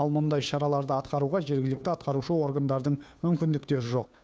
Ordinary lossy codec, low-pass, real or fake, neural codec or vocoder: none; none; fake; codec, 16 kHz, 2 kbps, FunCodec, trained on Chinese and English, 25 frames a second